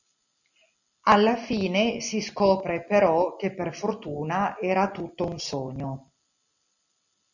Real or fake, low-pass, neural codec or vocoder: real; 7.2 kHz; none